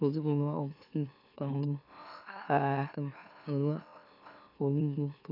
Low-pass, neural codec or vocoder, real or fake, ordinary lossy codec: 5.4 kHz; autoencoder, 44.1 kHz, a latent of 192 numbers a frame, MeloTTS; fake; none